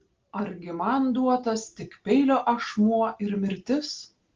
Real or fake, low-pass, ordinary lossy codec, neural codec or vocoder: real; 7.2 kHz; Opus, 16 kbps; none